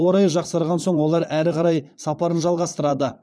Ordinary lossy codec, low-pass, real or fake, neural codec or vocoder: none; none; fake; vocoder, 22.05 kHz, 80 mel bands, Vocos